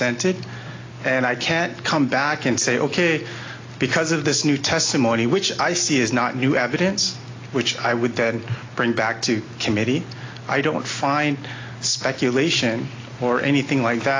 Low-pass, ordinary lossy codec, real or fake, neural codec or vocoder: 7.2 kHz; AAC, 32 kbps; real; none